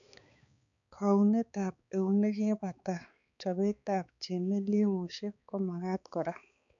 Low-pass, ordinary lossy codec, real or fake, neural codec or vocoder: 7.2 kHz; none; fake; codec, 16 kHz, 4 kbps, X-Codec, HuBERT features, trained on balanced general audio